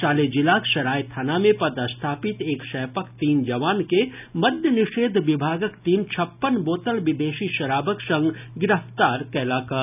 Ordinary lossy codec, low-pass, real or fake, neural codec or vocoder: none; 3.6 kHz; real; none